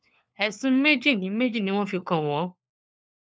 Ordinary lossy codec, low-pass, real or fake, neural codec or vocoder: none; none; fake; codec, 16 kHz, 4 kbps, FunCodec, trained on LibriTTS, 50 frames a second